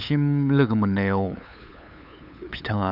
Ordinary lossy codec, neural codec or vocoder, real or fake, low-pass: none; codec, 16 kHz, 8 kbps, FunCodec, trained on LibriTTS, 25 frames a second; fake; 5.4 kHz